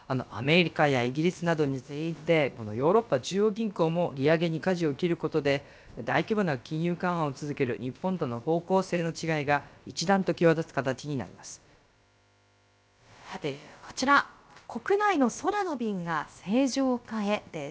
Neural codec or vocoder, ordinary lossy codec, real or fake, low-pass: codec, 16 kHz, about 1 kbps, DyCAST, with the encoder's durations; none; fake; none